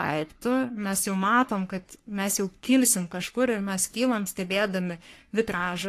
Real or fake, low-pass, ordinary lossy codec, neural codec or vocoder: fake; 14.4 kHz; AAC, 64 kbps; codec, 44.1 kHz, 3.4 kbps, Pupu-Codec